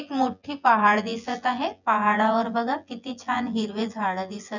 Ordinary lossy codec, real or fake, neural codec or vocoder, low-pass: none; fake; vocoder, 24 kHz, 100 mel bands, Vocos; 7.2 kHz